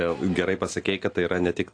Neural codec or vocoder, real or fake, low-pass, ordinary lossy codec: none; real; 9.9 kHz; AAC, 48 kbps